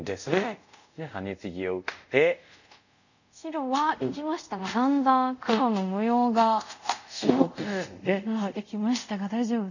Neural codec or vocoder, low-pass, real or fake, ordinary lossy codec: codec, 24 kHz, 0.5 kbps, DualCodec; 7.2 kHz; fake; none